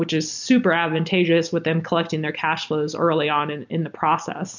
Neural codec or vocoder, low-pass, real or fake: none; 7.2 kHz; real